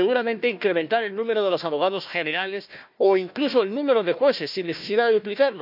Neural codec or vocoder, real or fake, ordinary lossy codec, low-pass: codec, 16 kHz, 1 kbps, FunCodec, trained on Chinese and English, 50 frames a second; fake; none; 5.4 kHz